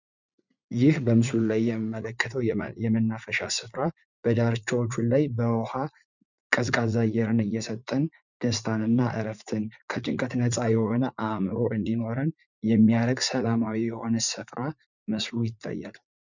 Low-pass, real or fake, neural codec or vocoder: 7.2 kHz; fake; vocoder, 44.1 kHz, 80 mel bands, Vocos